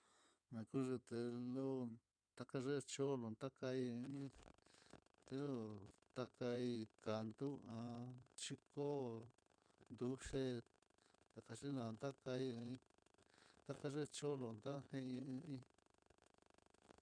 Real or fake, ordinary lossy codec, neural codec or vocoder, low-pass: fake; none; codec, 16 kHz in and 24 kHz out, 2.2 kbps, FireRedTTS-2 codec; 9.9 kHz